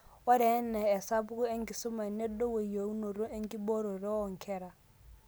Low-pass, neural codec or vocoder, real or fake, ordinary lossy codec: none; none; real; none